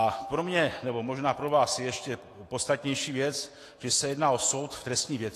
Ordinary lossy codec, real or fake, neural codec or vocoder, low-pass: AAC, 48 kbps; fake; autoencoder, 48 kHz, 128 numbers a frame, DAC-VAE, trained on Japanese speech; 14.4 kHz